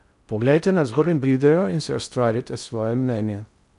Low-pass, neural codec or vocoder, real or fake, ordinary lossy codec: 10.8 kHz; codec, 16 kHz in and 24 kHz out, 0.6 kbps, FocalCodec, streaming, 4096 codes; fake; none